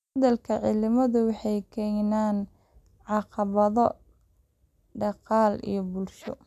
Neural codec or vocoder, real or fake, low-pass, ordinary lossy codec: none; real; 14.4 kHz; none